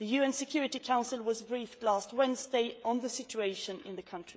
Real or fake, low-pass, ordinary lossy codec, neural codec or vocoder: fake; none; none; codec, 16 kHz, 16 kbps, FreqCodec, smaller model